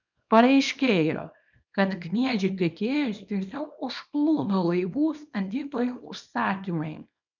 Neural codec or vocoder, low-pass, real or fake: codec, 24 kHz, 0.9 kbps, WavTokenizer, small release; 7.2 kHz; fake